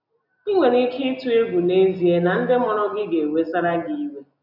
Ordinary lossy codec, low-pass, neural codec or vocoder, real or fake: none; 5.4 kHz; none; real